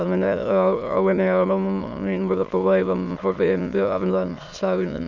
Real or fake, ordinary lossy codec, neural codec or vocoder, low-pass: fake; none; autoencoder, 22.05 kHz, a latent of 192 numbers a frame, VITS, trained on many speakers; 7.2 kHz